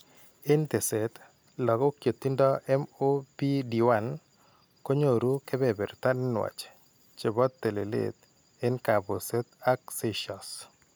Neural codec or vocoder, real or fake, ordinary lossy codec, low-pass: none; real; none; none